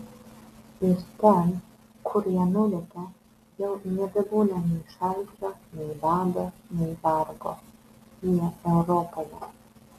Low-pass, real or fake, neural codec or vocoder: 14.4 kHz; real; none